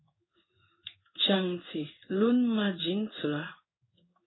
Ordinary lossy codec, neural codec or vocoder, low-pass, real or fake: AAC, 16 kbps; codec, 16 kHz in and 24 kHz out, 1 kbps, XY-Tokenizer; 7.2 kHz; fake